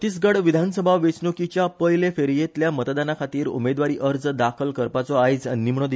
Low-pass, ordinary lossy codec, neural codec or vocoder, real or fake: none; none; none; real